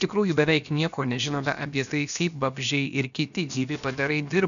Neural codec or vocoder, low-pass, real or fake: codec, 16 kHz, about 1 kbps, DyCAST, with the encoder's durations; 7.2 kHz; fake